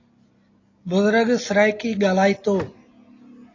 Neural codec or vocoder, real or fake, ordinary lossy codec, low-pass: none; real; AAC, 32 kbps; 7.2 kHz